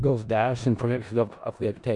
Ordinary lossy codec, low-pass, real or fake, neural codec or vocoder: Opus, 64 kbps; 10.8 kHz; fake; codec, 16 kHz in and 24 kHz out, 0.4 kbps, LongCat-Audio-Codec, four codebook decoder